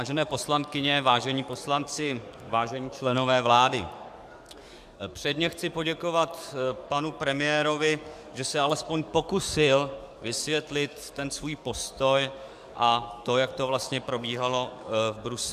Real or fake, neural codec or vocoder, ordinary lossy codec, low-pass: fake; codec, 44.1 kHz, 7.8 kbps, DAC; MP3, 96 kbps; 14.4 kHz